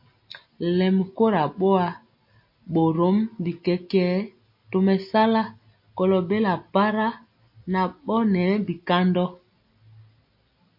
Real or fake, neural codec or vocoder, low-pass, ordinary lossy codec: real; none; 5.4 kHz; MP3, 32 kbps